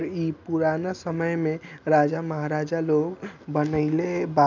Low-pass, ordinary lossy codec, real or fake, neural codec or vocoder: 7.2 kHz; none; real; none